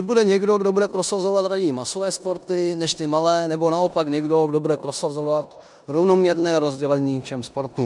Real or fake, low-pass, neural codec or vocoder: fake; 10.8 kHz; codec, 16 kHz in and 24 kHz out, 0.9 kbps, LongCat-Audio-Codec, four codebook decoder